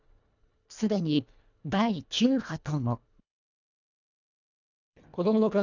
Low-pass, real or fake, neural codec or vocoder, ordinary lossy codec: 7.2 kHz; fake; codec, 24 kHz, 1.5 kbps, HILCodec; none